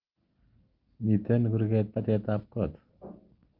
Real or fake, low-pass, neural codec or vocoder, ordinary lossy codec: real; 5.4 kHz; none; Opus, 32 kbps